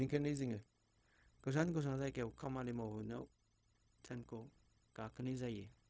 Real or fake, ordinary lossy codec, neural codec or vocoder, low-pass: fake; none; codec, 16 kHz, 0.4 kbps, LongCat-Audio-Codec; none